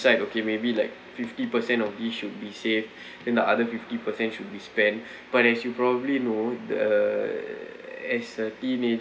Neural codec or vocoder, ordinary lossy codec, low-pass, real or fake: none; none; none; real